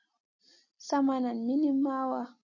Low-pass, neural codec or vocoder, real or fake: 7.2 kHz; none; real